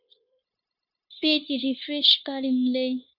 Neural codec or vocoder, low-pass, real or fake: codec, 16 kHz, 0.9 kbps, LongCat-Audio-Codec; 5.4 kHz; fake